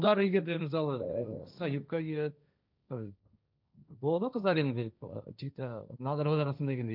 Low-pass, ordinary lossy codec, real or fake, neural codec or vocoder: 5.4 kHz; none; fake; codec, 16 kHz, 1.1 kbps, Voila-Tokenizer